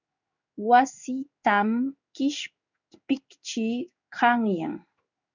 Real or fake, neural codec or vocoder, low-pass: fake; codec, 16 kHz in and 24 kHz out, 1 kbps, XY-Tokenizer; 7.2 kHz